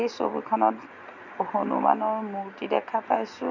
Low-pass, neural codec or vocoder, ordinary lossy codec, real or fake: 7.2 kHz; none; none; real